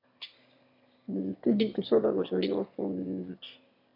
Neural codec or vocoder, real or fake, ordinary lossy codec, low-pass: autoencoder, 22.05 kHz, a latent of 192 numbers a frame, VITS, trained on one speaker; fake; MP3, 48 kbps; 5.4 kHz